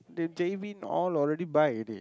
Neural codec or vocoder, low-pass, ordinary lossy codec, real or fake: none; none; none; real